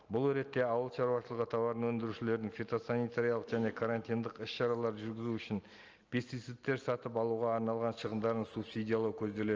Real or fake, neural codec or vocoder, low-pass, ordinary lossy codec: real; none; 7.2 kHz; Opus, 24 kbps